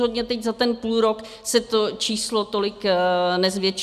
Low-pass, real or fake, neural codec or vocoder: 14.4 kHz; real; none